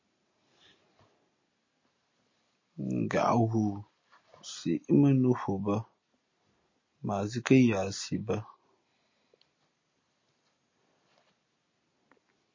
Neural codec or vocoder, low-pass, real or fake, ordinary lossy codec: none; 7.2 kHz; real; MP3, 32 kbps